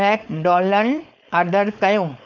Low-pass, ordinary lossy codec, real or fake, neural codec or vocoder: 7.2 kHz; none; fake; codec, 16 kHz, 4.8 kbps, FACodec